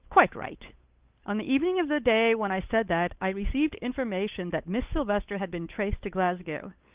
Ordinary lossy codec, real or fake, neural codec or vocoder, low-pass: Opus, 32 kbps; fake; codec, 16 kHz, 8 kbps, FunCodec, trained on Chinese and English, 25 frames a second; 3.6 kHz